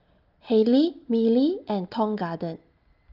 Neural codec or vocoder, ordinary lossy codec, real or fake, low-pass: none; Opus, 32 kbps; real; 5.4 kHz